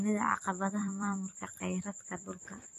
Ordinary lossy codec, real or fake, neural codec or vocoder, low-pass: AAC, 48 kbps; fake; vocoder, 44.1 kHz, 128 mel bands every 256 samples, BigVGAN v2; 14.4 kHz